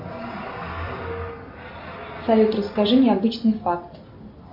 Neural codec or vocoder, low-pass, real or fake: none; 5.4 kHz; real